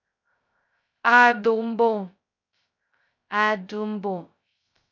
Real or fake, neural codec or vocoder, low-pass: fake; codec, 16 kHz, 0.2 kbps, FocalCodec; 7.2 kHz